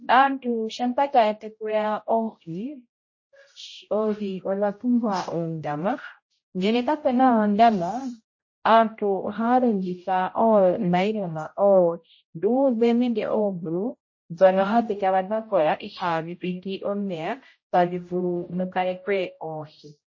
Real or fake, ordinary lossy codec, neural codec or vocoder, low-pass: fake; MP3, 32 kbps; codec, 16 kHz, 0.5 kbps, X-Codec, HuBERT features, trained on general audio; 7.2 kHz